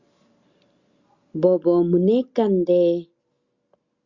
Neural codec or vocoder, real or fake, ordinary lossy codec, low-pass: none; real; Opus, 64 kbps; 7.2 kHz